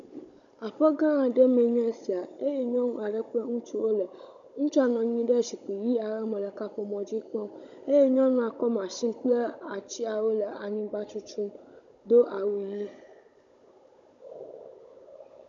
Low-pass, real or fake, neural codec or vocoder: 7.2 kHz; fake; codec, 16 kHz, 16 kbps, FunCodec, trained on Chinese and English, 50 frames a second